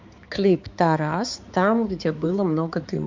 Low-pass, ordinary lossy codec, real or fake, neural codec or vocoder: 7.2 kHz; MP3, 64 kbps; fake; codec, 16 kHz, 4 kbps, X-Codec, HuBERT features, trained on balanced general audio